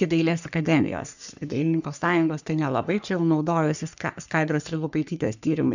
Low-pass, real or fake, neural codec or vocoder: 7.2 kHz; fake; codec, 44.1 kHz, 3.4 kbps, Pupu-Codec